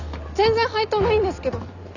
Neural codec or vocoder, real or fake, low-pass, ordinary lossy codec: none; real; 7.2 kHz; none